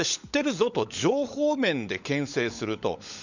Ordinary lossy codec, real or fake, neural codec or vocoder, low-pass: none; fake; codec, 16 kHz, 16 kbps, FunCodec, trained on LibriTTS, 50 frames a second; 7.2 kHz